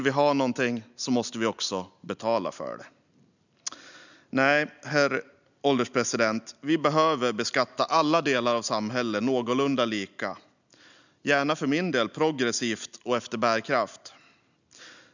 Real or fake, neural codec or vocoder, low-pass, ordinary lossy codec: real; none; 7.2 kHz; none